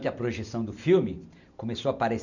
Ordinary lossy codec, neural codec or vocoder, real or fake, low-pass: none; none; real; 7.2 kHz